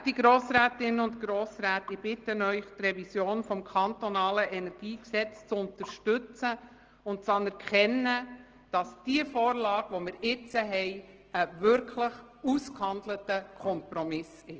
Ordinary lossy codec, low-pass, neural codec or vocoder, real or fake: Opus, 16 kbps; 7.2 kHz; none; real